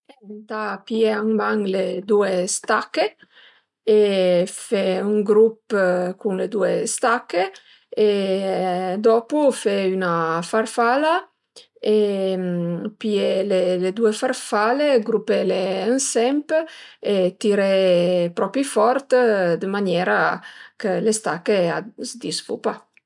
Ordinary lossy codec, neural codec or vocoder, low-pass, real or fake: none; none; 10.8 kHz; real